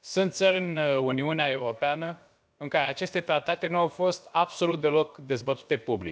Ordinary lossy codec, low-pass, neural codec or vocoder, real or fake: none; none; codec, 16 kHz, about 1 kbps, DyCAST, with the encoder's durations; fake